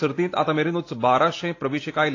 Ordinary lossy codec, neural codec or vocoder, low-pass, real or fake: AAC, 32 kbps; none; 7.2 kHz; real